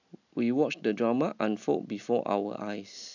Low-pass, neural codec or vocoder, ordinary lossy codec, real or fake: 7.2 kHz; none; none; real